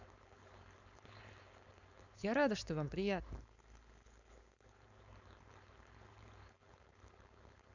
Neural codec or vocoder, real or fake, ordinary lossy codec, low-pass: codec, 16 kHz, 4.8 kbps, FACodec; fake; none; 7.2 kHz